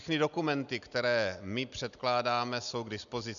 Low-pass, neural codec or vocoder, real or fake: 7.2 kHz; none; real